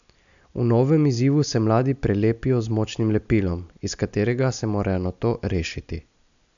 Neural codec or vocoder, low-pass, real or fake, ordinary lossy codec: none; 7.2 kHz; real; MP3, 96 kbps